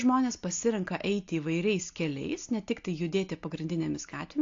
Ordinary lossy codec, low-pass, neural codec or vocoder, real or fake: AAC, 48 kbps; 7.2 kHz; none; real